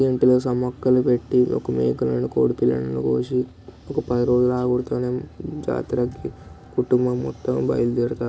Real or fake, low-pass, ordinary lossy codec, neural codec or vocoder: real; none; none; none